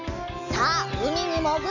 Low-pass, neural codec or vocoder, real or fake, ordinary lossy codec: 7.2 kHz; none; real; none